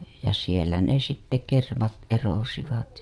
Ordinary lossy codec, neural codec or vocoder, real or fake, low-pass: none; vocoder, 22.05 kHz, 80 mel bands, WaveNeXt; fake; none